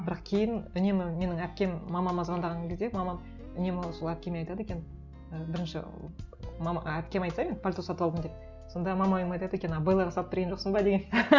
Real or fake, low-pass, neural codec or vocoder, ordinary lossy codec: real; 7.2 kHz; none; Opus, 64 kbps